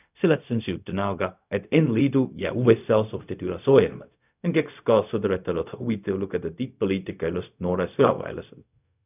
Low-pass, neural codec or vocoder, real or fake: 3.6 kHz; codec, 16 kHz, 0.4 kbps, LongCat-Audio-Codec; fake